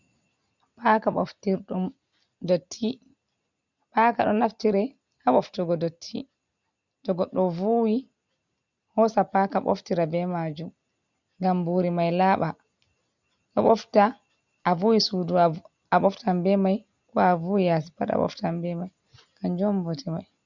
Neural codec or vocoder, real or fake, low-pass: none; real; 7.2 kHz